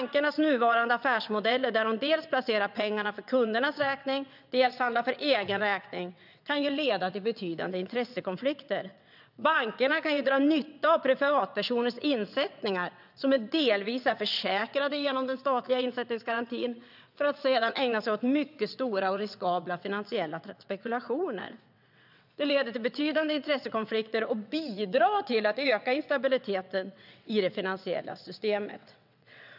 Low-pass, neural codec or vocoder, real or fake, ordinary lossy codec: 5.4 kHz; vocoder, 22.05 kHz, 80 mel bands, WaveNeXt; fake; none